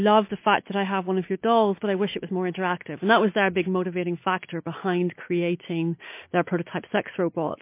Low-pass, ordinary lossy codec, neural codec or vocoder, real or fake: 3.6 kHz; MP3, 24 kbps; none; real